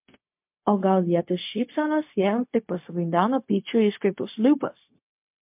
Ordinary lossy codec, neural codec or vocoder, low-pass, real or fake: MP3, 32 kbps; codec, 16 kHz, 0.4 kbps, LongCat-Audio-Codec; 3.6 kHz; fake